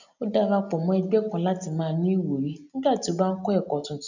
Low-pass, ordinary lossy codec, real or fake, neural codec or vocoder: 7.2 kHz; none; real; none